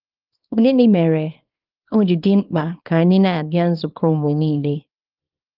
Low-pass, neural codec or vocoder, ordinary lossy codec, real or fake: 5.4 kHz; codec, 24 kHz, 0.9 kbps, WavTokenizer, small release; Opus, 32 kbps; fake